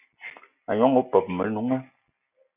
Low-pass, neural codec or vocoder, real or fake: 3.6 kHz; none; real